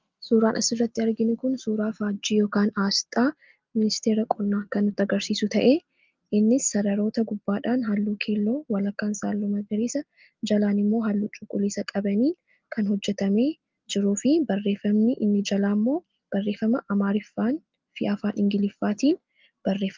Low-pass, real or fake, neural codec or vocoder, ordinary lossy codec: 7.2 kHz; real; none; Opus, 32 kbps